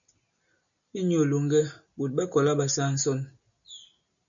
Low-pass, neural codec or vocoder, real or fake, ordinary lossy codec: 7.2 kHz; none; real; MP3, 96 kbps